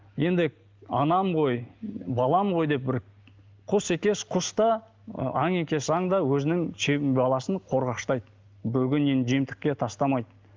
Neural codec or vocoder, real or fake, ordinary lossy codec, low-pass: none; real; Opus, 32 kbps; 7.2 kHz